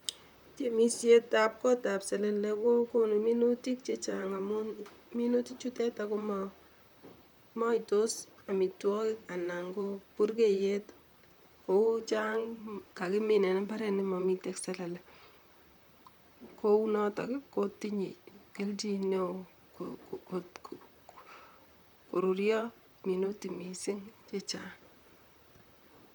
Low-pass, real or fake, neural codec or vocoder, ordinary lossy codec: 19.8 kHz; fake; vocoder, 44.1 kHz, 128 mel bands, Pupu-Vocoder; none